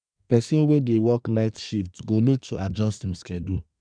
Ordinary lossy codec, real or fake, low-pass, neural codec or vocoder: none; fake; 9.9 kHz; codec, 24 kHz, 1 kbps, SNAC